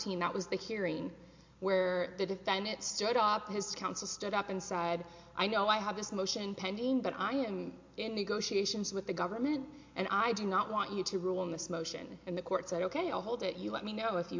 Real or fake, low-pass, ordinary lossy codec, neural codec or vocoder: real; 7.2 kHz; MP3, 48 kbps; none